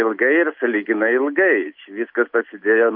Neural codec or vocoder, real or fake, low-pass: none; real; 5.4 kHz